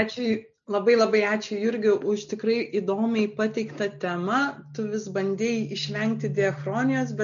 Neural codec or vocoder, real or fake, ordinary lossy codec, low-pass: none; real; MP3, 96 kbps; 7.2 kHz